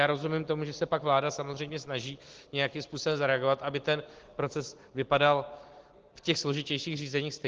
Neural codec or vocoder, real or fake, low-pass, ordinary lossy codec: none; real; 7.2 kHz; Opus, 16 kbps